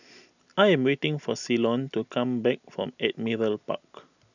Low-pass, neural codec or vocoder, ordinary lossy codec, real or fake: 7.2 kHz; none; none; real